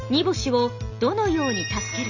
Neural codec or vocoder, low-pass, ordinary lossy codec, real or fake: none; 7.2 kHz; none; real